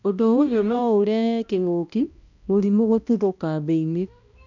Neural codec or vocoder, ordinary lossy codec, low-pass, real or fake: codec, 16 kHz, 1 kbps, X-Codec, HuBERT features, trained on balanced general audio; none; 7.2 kHz; fake